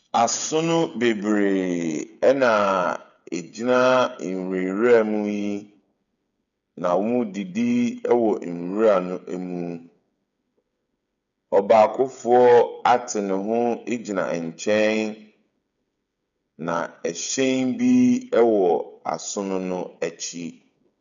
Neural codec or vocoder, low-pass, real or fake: codec, 16 kHz, 8 kbps, FreqCodec, smaller model; 7.2 kHz; fake